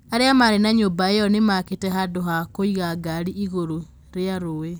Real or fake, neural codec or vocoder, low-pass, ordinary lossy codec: real; none; none; none